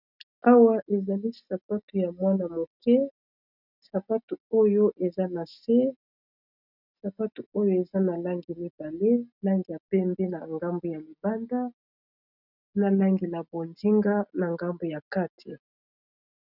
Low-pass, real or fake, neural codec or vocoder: 5.4 kHz; real; none